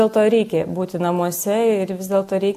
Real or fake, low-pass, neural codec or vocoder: real; 14.4 kHz; none